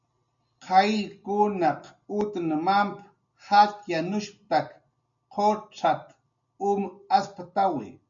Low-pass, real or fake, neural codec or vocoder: 7.2 kHz; real; none